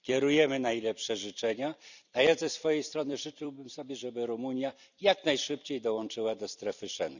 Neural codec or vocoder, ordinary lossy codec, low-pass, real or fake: vocoder, 44.1 kHz, 128 mel bands every 256 samples, BigVGAN v2; none; 7.2 kHz; fake